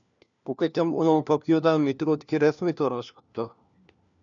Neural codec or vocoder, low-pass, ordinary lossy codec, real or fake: codec, 16 kHz, 1 kbps, FunCodec, trained on LibriTTS, 50 frames a second; 7.2 kHz; MP3, 96 kbps; fake